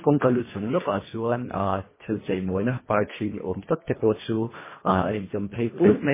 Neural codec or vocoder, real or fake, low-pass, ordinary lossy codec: codec, 24 kHz, 1.5 kbps, HILCodec; fake; 3.6 kHz; MP3, 16 kbps